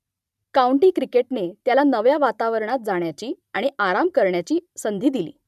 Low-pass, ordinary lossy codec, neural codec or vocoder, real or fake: 14.4 kHz; none; vocoder, 44.1 kHz, 128 mel bands every 512 samples, BigVGAN v2; fake